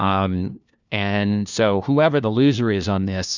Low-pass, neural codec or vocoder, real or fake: 7.2 kHz; codec, 16 kHz, 1 kbps, FunCodec, trained on LibriTTS, 50 frames a second; fake